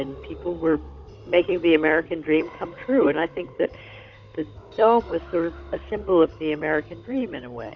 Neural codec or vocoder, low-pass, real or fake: codec, 16 kHz, 16 kbps, FunCodec, trained on Chinese and English, 50 frames a second; 7.2 kHz; fake